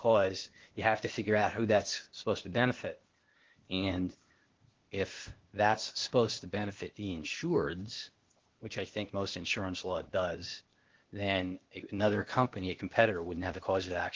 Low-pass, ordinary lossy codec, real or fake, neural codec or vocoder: 7.2 kHz; Opus, 16 kbps; fake; codec, 16 kHz, 0.8 kbps, ZipCodec